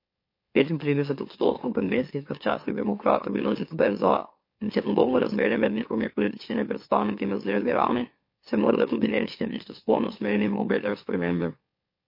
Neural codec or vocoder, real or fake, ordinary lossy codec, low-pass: autoencoder, 44.1 kHz, a latent of 192 numbers a frame, MeloTTS; fake; MP3, 32 kbps; 5.4 kHz